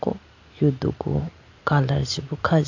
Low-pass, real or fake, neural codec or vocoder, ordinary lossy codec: 7.2 kHz; real; none; AAC, 48 kbps